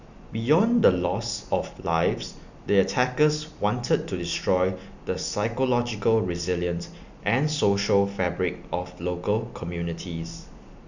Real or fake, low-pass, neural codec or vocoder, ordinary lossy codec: real; 7.2 kHz; none; none